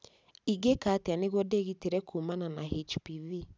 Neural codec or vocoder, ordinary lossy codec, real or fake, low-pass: none; none; real; none